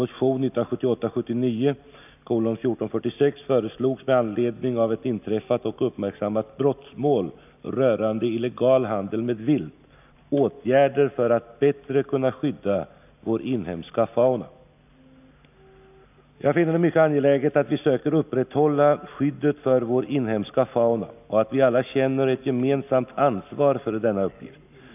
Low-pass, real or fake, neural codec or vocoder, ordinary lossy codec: 3.6 kHz; real; none; none